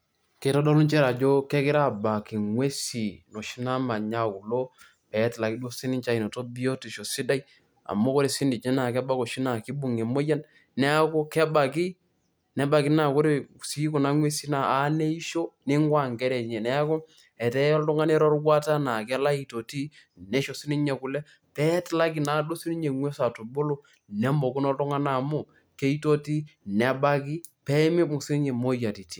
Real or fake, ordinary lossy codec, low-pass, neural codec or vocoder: real; none; none; none